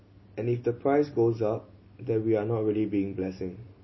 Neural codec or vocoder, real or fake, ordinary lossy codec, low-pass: none; real; MP3, 24 kbps; 7.2 kHz